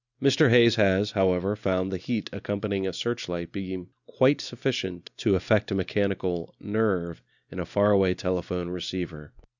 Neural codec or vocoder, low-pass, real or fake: none; 7.2 kHz; real